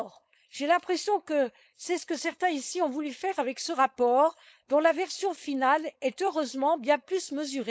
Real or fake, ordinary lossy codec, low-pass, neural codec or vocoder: fake; none; none; codec, 16 kHz, 4.8 kbps, FACodec